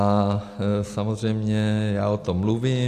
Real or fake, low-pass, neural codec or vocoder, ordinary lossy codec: real; 14.4 kHz; none; AAC, 64 kbps